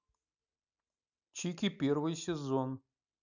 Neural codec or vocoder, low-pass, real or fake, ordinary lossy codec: none; 7.2 kHz; real; none